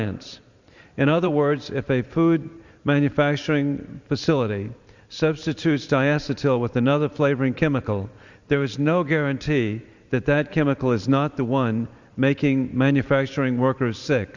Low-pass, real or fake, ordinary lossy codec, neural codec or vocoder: 7.2 kHz; fake; Opus, 64 kbps; vocoder, 44.1 kHz, 128 mel bands every 512 samples, BigVGAN v2